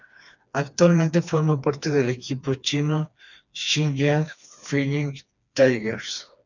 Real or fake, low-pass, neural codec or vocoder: fake; 7.2 kHz; codec, 16 kHz, 2 kbps, FreqCodec, smaller model